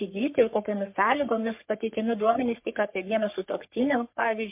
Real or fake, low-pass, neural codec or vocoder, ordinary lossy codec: fake; 3.6 kHz; codec, 44.1 kHz, 3.4 kbps, Pupu-Codec; MP3, 32 kbps